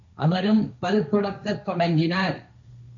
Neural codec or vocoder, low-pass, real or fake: codec, 16 kHz, 1.1 kbps, Voila-Tokenizer; 7.2 kHz; fake